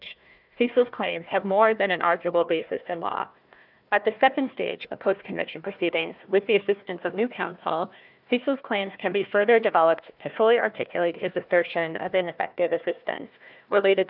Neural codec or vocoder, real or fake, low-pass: codec, 16 kHz, 1 kbps, FunCodec, trained on Chinese and English, 50 frames a second; fake; 5.4 kHz